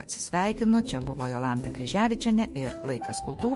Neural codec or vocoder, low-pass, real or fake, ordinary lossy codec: autoencoder, 48 kHz, 32 numbers a frame, DAC-VAE, trained on Japanese speech; 14.4 kHz; fake; MP3, 48 kbps